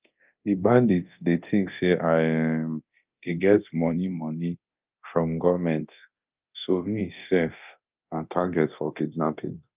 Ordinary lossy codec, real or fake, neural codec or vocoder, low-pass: Opus, 24 kbps; fake; codec, 24 kHz, 0.9 kbps, DualCodec; 3.6 kHz